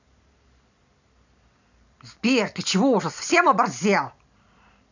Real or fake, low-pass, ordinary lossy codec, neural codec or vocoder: fake; 7.2 kHz; none; vocoder, 44.1 kHz, 128 mel bands every 256 samples, BigVGAN v2